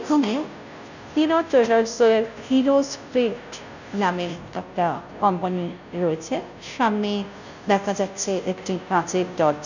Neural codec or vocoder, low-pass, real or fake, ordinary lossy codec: codec, 16 kHz, 0.5 kbps, FunCodec, trained on Chinese and English, 25 frames a second; 7.2 kHz; fake; none